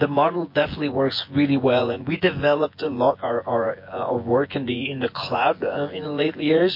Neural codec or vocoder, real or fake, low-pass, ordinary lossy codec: vocoder, 24 kHz, 100 mel bands, Vocos; fake; 5.4 kHz; MP3, 32 kbps